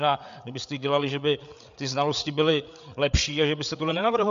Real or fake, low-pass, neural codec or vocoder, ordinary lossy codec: fake; 7.2 kHz; codec, 16 kHz, 8 kbps, FreqCodec, larger model; MP3, 64 kbps